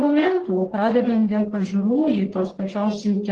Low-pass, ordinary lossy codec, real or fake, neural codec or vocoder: 10.8 kHz; Opus, 16 kbps; fake; codec, 44.1 kHz, 1.7 kbps, Pupu-Codec